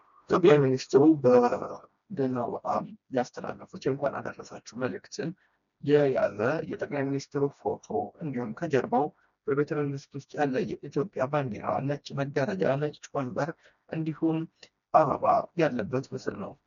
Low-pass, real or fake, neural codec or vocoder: 7.2 kHz; fake; codec, 16 kHz, 1 kbps, FreqCodec, smaller model